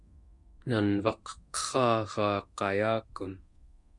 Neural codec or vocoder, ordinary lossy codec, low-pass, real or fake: codec, 24 kHz, 0.9 kbps, DualCodec; MP3, 64 kbps; 10.8 kHz; fake